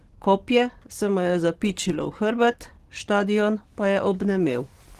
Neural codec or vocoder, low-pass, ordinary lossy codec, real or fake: codec, 44.1 kHz, 7.8 kbps, DAC; 14.4 kHz; Opus, 16 kbps; fake